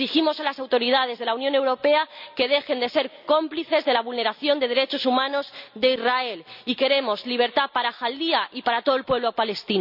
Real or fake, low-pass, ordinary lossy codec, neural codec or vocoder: real; 5.4 kHz; none; none